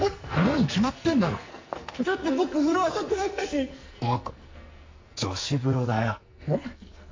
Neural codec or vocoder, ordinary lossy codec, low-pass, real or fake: codec, 44.1 kHz, 2.6 kbps, SNAC; MP3, 48 kbps; 7.2 kHz; fake